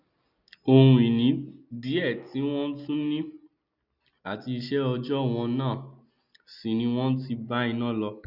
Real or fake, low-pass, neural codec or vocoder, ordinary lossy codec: real; 5.4 kHz; none; none